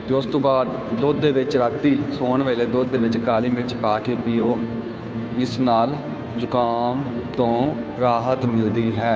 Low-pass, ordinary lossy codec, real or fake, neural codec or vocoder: none; none; fake; codec, 16 kHz, 2 kbps, FunCodec, trained on Chinese and English, 25 frames a second